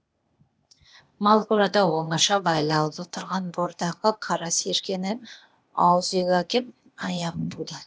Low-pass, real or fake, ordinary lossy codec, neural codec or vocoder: none; fake; none; codec, 16 kHz, 0.8 kbps, ZipCodec